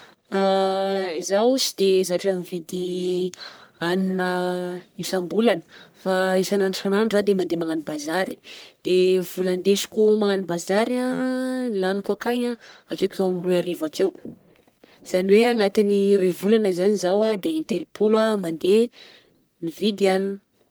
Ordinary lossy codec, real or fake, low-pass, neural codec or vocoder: none; fake; none; codec, 44.1 kHz, 1.7 kbps, Pupu-Codec